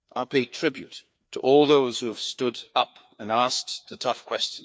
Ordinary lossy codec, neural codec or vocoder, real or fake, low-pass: none; codec, 16 kHz, 2 kbps, FreqCodec, larger model; fake; none